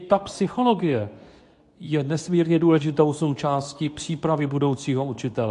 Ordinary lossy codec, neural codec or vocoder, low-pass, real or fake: AAC, 64 kbps; codec, 24 kHz, 0.9 kbps, WavTokenizer, medium speech release version 2; 10.8 kHz; fake